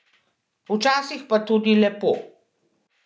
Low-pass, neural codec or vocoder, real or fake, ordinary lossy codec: none; none; real; none